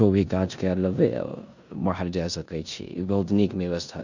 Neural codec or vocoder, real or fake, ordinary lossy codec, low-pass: codec, 16 kHz in and 24 kHz out, 0.9 kbps, LongCat-Audio-Codec, four codebook decoder; fake; none; 7.2 kHz